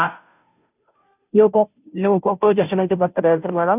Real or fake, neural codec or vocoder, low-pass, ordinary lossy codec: fake; codec, 16 kHz, 0.5 kbps, FunCodec, trained on Chinese and English, 25 frames a second; 3.6 kHz; none